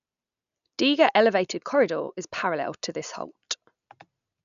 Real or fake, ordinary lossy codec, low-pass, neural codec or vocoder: real; none; 7.2 kHz; none